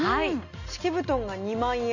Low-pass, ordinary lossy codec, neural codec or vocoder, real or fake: 7.2 kHz; none; none; real